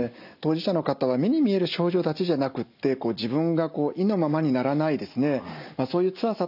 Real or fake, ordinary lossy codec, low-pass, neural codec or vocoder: real; none; 5.4 kHz; none